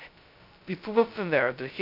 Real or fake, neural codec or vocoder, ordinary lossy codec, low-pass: fake; codec, 16 kHz, 0.2 kbps, FocalCodec; none; 5.4 kHz